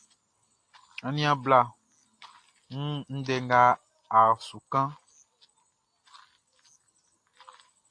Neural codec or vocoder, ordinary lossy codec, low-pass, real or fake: none; AAC, 48 kbps; 9.9 kHz; real